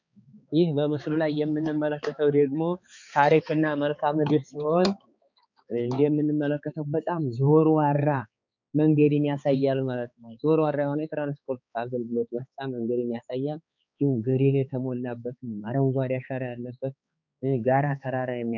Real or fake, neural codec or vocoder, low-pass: fake; codec, 16 kHz, 4 kbps, X-Codec, HuBERT features, trained on balanced general audio; 7.2 kHz